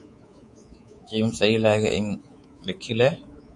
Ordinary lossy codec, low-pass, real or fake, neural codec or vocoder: MP3, 48 kbps; 10.8 kHz; fake; codec, 24 kHz, 3.1 kbps, DualCodec